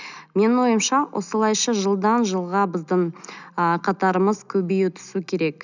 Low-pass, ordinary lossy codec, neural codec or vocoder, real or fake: 7.2 kHz; none; none; real